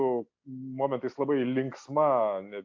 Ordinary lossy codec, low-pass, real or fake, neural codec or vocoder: AAC, 48 kbps; 7.2 kHz; real; none